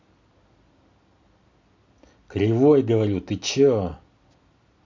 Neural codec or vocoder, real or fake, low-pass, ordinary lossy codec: none; real; 7.2 kHz; MP3, 48 kbps